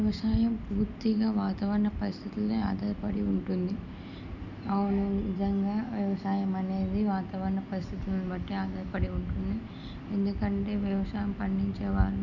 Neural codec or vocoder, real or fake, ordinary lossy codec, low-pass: none; real; none; 7.2 kHz